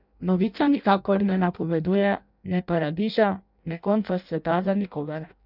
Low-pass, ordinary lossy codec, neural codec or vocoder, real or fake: 5.4 kHz; none; codec, 16 kHz in and 24 kHz out, 0.6 kbps, FireRedTTS-2 codec; fake